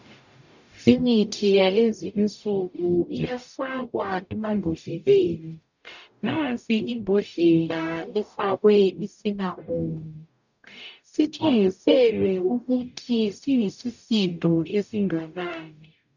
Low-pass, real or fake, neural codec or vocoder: 7.2 kHz; fake; codec, 44.1 kHz, 0.9 kbps, DAC